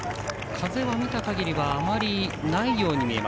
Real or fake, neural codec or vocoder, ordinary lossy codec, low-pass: real; none; none; none